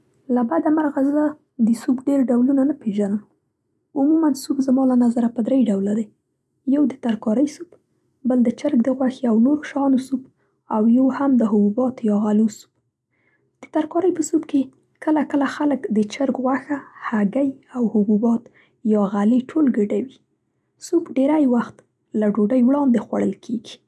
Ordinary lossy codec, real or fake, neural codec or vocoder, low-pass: none; real; none; none